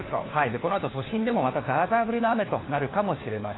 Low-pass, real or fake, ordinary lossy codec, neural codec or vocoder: 7.2 kHz; fake; AAC, 16 kbps; codec, 16 kHz, 4 kbps, FunCodec, trained on LibriTTS, 50 frames a second